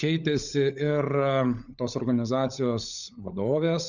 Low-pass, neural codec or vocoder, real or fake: 7.2 kHz; codec, 16 kHz, 16 kbps, FunCodec, trained on LibriTTS, 50 frames a second; fake